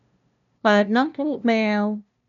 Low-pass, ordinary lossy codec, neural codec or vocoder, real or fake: 7.2 kHz; MP3, 96 kbps; codec, 16 kHz, 0.5 kbps, FunCodec, trained on LibriTTS, 25 frames a second; fake